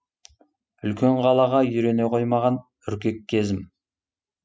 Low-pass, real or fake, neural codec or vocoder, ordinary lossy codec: none; real; none; none